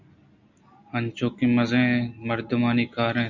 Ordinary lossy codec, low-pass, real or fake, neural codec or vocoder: Opus, 64 kbps; 7.2 kHz; real; none